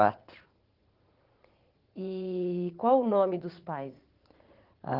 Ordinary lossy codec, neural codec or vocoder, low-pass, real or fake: Opus, 16 kbps; vocoder, 44.1 kHz, 80 mel bands, Vocos; 5.4 kHz; fake